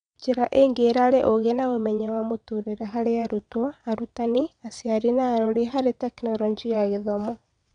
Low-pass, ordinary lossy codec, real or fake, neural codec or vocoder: 9.9 kHz; none; fake; vocoder, 22.05 kHz, 80 mel bands, WaveNeXt